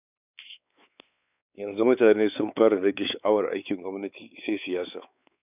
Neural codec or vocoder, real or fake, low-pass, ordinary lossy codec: codec, 16 kHz, 4 kbps, X-Codec, WavLM features, trained on Multilingual LibriSpeech; fake; 3.6 kHz; none